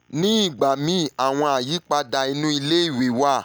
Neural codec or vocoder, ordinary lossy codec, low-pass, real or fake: none; none; none; real